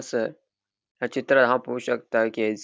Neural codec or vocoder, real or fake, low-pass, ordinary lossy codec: none; real; none; none